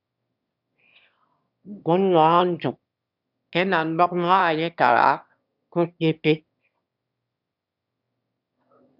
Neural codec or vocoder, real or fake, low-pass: autoencoder, 22.05 kHz, a latent of 192 numbers a frame, VITS, trained on one speaker; fake; 5.4 kHz